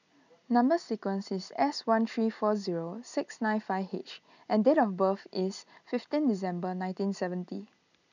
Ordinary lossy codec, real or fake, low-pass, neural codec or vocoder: none; real; 7.2 kHz; none